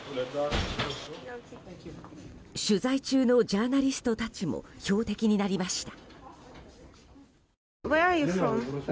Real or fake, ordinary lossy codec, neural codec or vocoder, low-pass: real; none; none; none